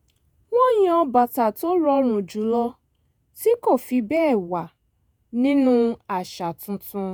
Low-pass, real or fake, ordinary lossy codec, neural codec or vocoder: none; fake; none; vocoder, 48 kHz, 128 mel bands, Vocos